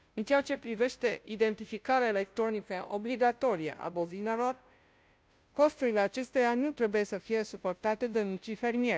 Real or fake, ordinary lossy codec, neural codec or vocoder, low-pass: fake; none; codec, 16 kHz, 0.5 kbps, FunCodec, trained on Chinese and English, 25 frames a second; none